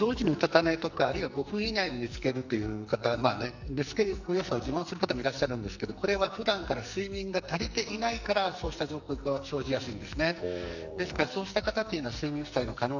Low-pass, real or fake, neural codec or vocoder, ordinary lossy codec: 7.2 kHz; fake; codec, 44.1 kHz, 2.6 kbps, SNAC; none